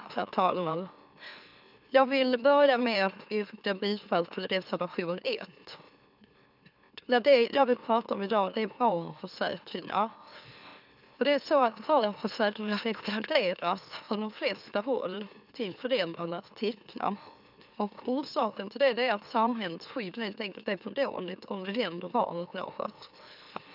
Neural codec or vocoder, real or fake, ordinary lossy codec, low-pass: autoencoder, 44.1 kHz, a latent of 192 numbers a frame, MeloTTS; fake; none; 5.4 kHz